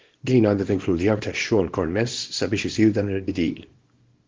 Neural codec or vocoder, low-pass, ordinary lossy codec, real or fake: codec, 24 kHz, 0.9 kbps, WavTokenizer, small release; 7.2 kHz; Opus, 16 kbps; fake